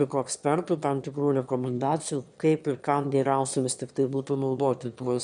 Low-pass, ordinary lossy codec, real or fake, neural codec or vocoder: 9.9 kHz; MP3, 96 kbps; fake; autoencoder, 22.05 kHz, a latent of 192 numbers a frame, VITS, trained on one speaker